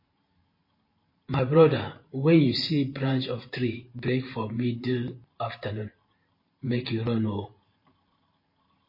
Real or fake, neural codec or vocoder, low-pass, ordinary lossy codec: fake; vocoder, 44.1 kHz, 128 mel bands every 512 samples, BigVGAN v2; 5.4 kHz; MP3, 24 kbps